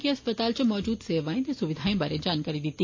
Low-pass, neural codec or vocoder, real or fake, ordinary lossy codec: 7.2 kHz; none; real; MP3, 32 kbps